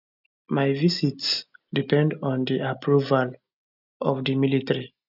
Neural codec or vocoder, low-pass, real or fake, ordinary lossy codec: none; 5.4 kHz; real; none